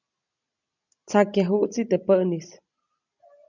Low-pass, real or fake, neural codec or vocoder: 7.2 kHz; real; none